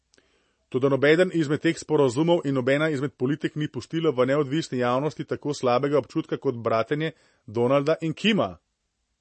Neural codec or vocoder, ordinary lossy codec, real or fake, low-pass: none; MP3, 32 kbps; real; 9.9 kHz